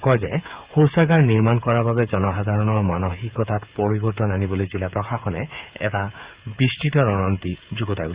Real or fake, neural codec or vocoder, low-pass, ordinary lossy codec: fake; codec, 16 kHz, 8 kbps, FreqCodec, smaller model; 3.6 kHz; Opus, 64 kbps